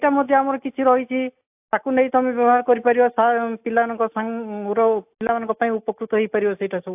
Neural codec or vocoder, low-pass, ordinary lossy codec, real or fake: none; 3.6 kHz; AAC, 32 kbps; real